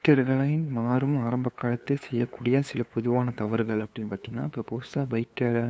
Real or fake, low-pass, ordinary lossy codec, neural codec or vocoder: fake; none; none; codec, 16 kHz, 2 kbps, FunCodec, trained on LibriTTS, 25 frames a second